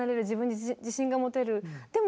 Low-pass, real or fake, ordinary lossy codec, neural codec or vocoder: none; real; none; none